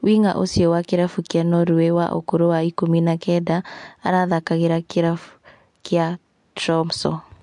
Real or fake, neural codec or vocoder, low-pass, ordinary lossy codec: real; none; 10.8 kHz; MP3, 64 kbps